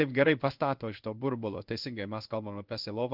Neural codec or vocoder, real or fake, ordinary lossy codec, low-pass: codec, 16 kHz in and 24 kHz out, 1 kbps, XY-Tokenizer; fake; Opus, 24 kbps; 5.4 kHz